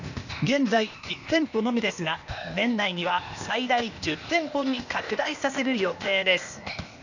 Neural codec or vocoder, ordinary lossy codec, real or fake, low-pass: codec, 16 kHz, 0.8 kbps, ZipCodec; none; fake; 7.2 kHz